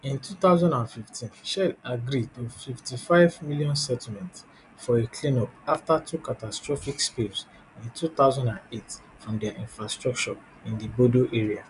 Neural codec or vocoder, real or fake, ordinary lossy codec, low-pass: none; real; none; 10.8 kHz